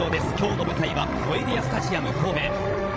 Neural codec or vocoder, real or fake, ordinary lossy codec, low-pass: codec, 16 kHz, 16 kbps, FreqCodec, larger model; fake; none; none